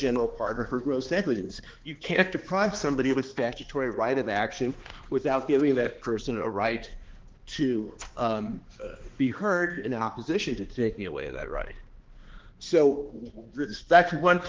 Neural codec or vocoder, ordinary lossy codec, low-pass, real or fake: codec, 16 kHz, 2 kbps, X-Codec, HuBERT features, trained on balanced general audio; Opus, 16 kbps; 7.2 kHz; fake